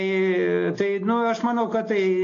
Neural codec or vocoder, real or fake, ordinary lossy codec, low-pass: none; real; AAC, 48 kbps; 7.2 kHz